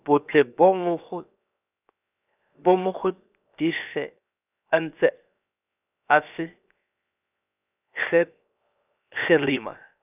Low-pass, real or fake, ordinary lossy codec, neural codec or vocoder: 3.6 kHz; fake; none; codec, 16 kHz, 0.7 kbps, FocalCodec